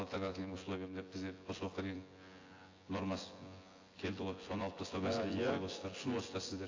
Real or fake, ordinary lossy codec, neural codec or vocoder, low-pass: fake; AAC, 32 kbps; vocoder, 24 kHz, 100 mel bands, Vocos; 7.2 kHz